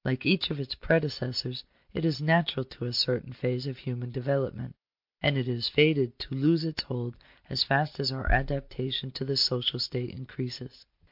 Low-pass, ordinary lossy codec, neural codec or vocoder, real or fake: 5.4 kHz; MP3, 48 kbps; none; real